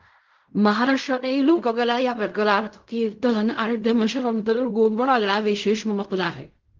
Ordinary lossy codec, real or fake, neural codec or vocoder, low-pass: Opus, 24 kbps; fake; codec, 16 kHz in and 24 kHz out, 0.4 kbps, LongCat-Audio-Codec, fine tuned four codebook decoder; 7.2 kHz